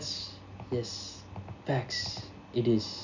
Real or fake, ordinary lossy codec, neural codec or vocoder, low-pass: real; none; none; 7.2 kHz